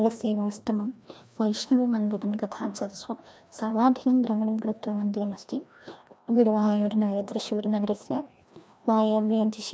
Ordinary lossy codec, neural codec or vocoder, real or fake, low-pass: none; codec, 16 kHz, 1 kbps, FreqCodec, larger model; fake; none